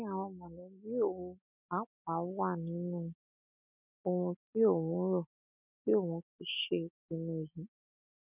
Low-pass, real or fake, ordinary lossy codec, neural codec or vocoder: 3.6 kHz; real; none; none